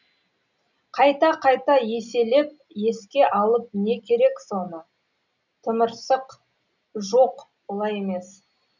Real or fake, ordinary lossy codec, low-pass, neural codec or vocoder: real; none; 7.2 kHz; none